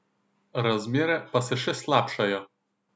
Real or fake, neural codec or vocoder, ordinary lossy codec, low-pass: real; none; none; none